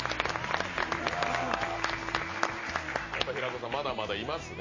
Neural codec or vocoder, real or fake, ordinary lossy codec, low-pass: none; real; MP3, 32 kbps; 7.2 kHz